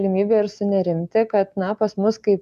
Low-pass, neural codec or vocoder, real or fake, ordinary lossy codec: 14.4 kHz; none; real; MP3, 96 kbps